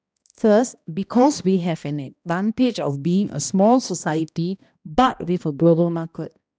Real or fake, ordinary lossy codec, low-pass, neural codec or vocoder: fake; none; none; codec, 16 kHz, 1 kbps, X-Codec, HuBERT features, trained on balanced general audio